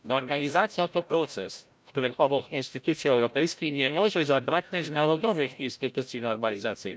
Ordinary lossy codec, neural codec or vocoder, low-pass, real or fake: none; codec, 16 kHz, 0.5 kbps, FreqCodec, larger model; none; fake